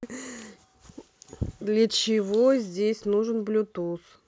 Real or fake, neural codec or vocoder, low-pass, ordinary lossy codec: real; none; none; none